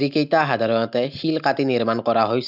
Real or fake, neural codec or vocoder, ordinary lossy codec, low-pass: real; none; none; 5.4 kHz